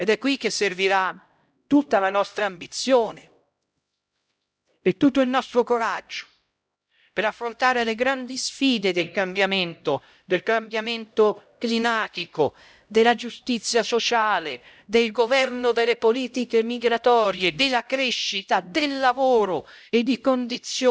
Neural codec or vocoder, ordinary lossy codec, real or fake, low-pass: codec, 16 kHz, 0.5 kbps, X-Codec, HuBERT features, trained on LibriSpeech; none; fake; none